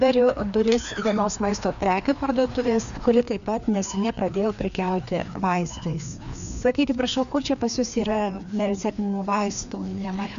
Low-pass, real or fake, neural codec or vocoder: 7.2 kHz; fake; codec, 16 kHz, 2 kbps, FreqCodec, larger model